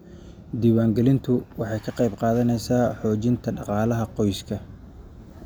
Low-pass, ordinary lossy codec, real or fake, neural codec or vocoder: none; none; real; none